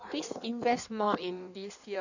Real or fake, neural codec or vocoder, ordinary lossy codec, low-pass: fake; codec, 16 kHz in and 24 kHz out, 1.1 kbps, FireRedTTS-2 codec; none; 7.2 kHz